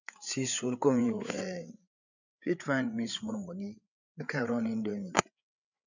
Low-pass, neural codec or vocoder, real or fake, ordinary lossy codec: 7.2 kHz; codec, 16 kHz, 8 kbps, FreqCodec, larger model; fake; none